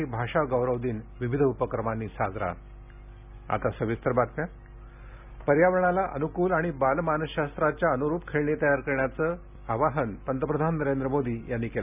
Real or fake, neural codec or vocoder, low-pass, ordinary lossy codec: real; none; 3.6 kHz; none